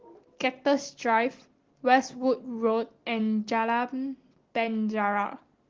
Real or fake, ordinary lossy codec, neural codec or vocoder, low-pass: real; Opus, 16 kbps; none; 7.2 kHz